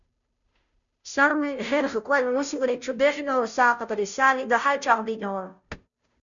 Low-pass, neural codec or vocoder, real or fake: 7.2 kHz; codec, 16 kHz, 0.5 kbps, FunCodec, trained on Chinese and English, 25 frames a second; fake